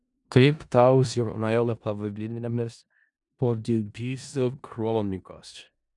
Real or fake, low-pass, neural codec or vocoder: fake; 10.8 kHz; codec, 16 kHz in and 24 kHz out, 0.4 kbps, LongCat-Audio-Codec, four codebook decoder